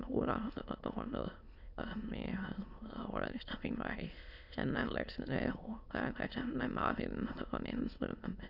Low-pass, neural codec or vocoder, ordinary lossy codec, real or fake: 5.4 kHz; autoencoder, 22.05 kHz, a latent of 192 numbers a frame, VITS, trained on many speakers; none; fake